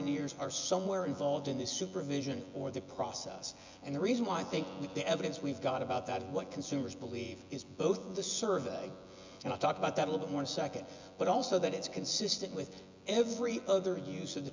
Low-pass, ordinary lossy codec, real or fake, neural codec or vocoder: 7.2 kHz; MP3, 64 kbps; fake; vocoder, 24 kHz, 100 mel bands, Vocos